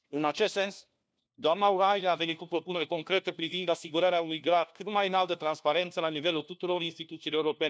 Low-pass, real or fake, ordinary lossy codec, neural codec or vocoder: none; fake; none; codec, 16 kHz, 1 kbps, FunCodec, trained on LibriTTS, 50 frames a second